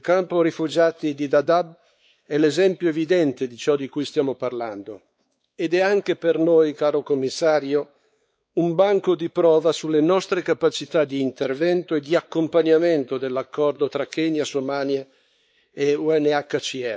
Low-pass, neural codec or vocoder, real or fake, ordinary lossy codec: none; codec, 16 kHz, 4 kbps, X-Codec, WavLM features, trained on Multilingual LibriSpeech; fake; none